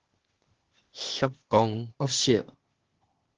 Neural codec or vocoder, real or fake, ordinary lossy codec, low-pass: codec, 16 kHz, 0.8 kbps, ZipCodec; fake; Opus, 16 kbps; 7.2 kHz